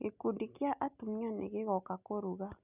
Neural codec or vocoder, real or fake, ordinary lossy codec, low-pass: none; real; none; 3.6 kHz